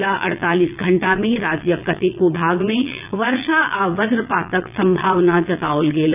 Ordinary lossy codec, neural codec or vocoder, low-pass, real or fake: AAC, 32 kbps; vocoder, 22.05 kHz, 80 mel bands, Vocos; 3.6 kHz; fake